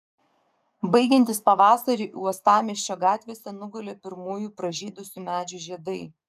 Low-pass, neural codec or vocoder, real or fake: 14.4 kHz; codec, 44.1 kHz, 7.8 kbps, DAC; fake